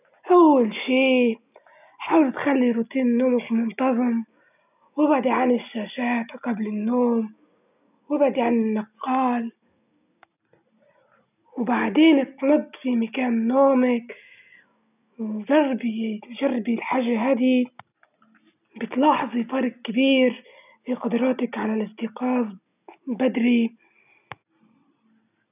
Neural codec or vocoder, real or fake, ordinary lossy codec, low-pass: none; real; none; 3.6 kHz